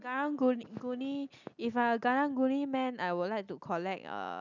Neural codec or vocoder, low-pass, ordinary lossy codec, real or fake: none; 7.2 kHz; none; real